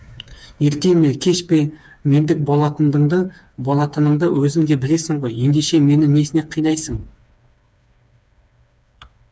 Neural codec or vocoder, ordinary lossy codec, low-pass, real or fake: codec, 16 kHz, 4 kbps, FreqCodec, smaller model; none; none; fake